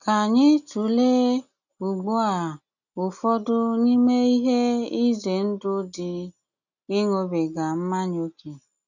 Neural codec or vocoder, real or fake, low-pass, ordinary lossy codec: none; real; 7.2 kHz; none